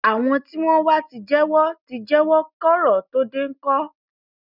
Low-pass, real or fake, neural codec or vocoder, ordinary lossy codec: 5.4 kHz; real; none; none